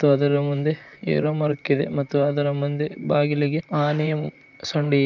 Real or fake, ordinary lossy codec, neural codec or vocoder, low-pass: fake; none; vocoder, 44.1 kHz, 128 mel bands, Pupu-Vocoder; 7.2 kHz